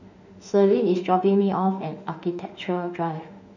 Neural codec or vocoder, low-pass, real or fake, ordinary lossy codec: autoencoder, 48 kHz, 32 numbers a frame, DAC-VAE, trained on Japanese speech; 7.2 kHz; fake; none